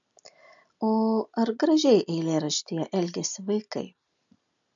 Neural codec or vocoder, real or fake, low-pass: none; real; 7.2 kHz